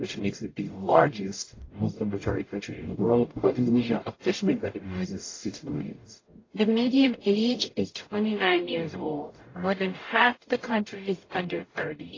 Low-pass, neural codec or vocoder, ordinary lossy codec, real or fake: 7.2 kHz; codec, 44.1 kHz, 0.9 kbps, DAC; AAC, 32 kbps; fake